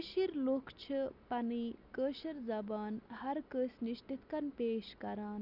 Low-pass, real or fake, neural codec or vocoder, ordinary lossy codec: 5.4 kHz; real; none; none